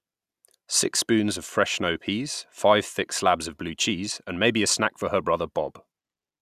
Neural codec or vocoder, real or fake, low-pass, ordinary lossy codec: none; real; 14.4 kHz; none